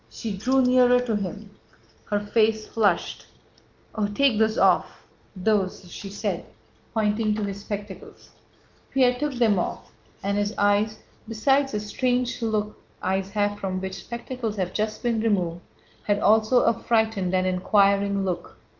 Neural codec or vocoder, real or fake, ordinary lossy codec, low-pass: none; real; Opus, 32 kbps; 7.2 kHz